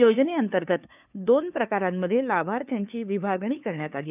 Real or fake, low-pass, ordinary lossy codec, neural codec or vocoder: fake; 3.6 kHz; none; codec, 16 kHz, 2 kbps, FunCodec, trained on LibriTTS, 25 frames a second